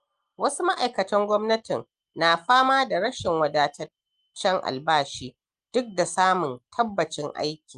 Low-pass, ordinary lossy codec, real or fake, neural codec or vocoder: 9.9 kHz; Opus, 32 kbps; real; none